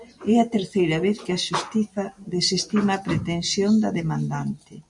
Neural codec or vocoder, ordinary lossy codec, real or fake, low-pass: none; MP3, 64 kbps; real; 10.8 kHz